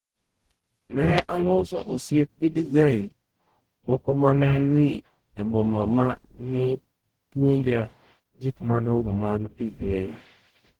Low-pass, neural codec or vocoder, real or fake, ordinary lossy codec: 19.8 kHz; codec, 44.1 kHz, 0.9 kbps, DAC; fake; Opus, 16 kbps